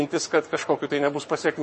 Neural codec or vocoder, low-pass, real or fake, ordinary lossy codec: codec, 44.1 kHz, 7.8 kbps, Pupu-Codec; 10.8 kHz; fake; MP3, 32 kbps